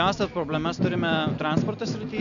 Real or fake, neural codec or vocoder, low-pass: real; none; 7.2 kHz